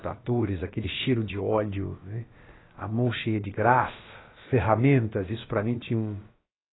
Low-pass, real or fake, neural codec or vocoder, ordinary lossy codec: 7.2 kHz; fake; codec, 16 kHz, about 1 kbps, DyCAST, with the encoder's durations; AAC, 16 kbps